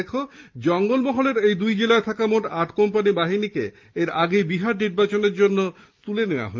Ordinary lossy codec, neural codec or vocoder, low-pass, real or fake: Opus, 24 kbps; none; 7.2 kHz; real